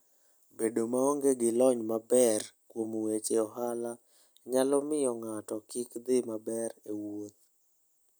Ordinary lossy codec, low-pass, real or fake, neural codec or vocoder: none; none; real; none